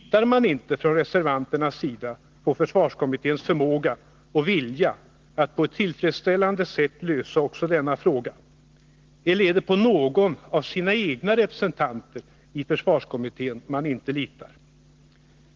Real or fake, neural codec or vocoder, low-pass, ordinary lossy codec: real; none; 7.2 kHz; Opus, 16 kbps